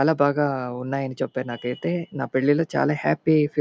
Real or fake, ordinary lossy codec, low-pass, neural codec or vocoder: real; none; none; none